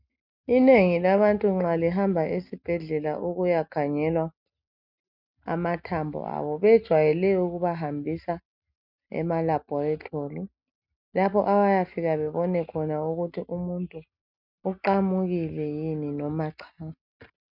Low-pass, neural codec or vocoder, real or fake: 5.4 kHz; none; real